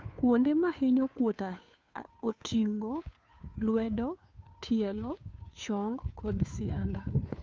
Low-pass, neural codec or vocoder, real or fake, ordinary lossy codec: none; codec, 16 kHz, 2 kbps, FunCodec, trained on Chinese and English, 25 frames a second; fake; none